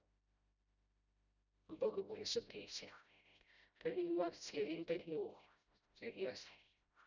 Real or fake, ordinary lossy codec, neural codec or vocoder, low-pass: fake; none; codec, 16 kHz, 0.5 kbps, FreqCodec, smaller model; 7.2 kHz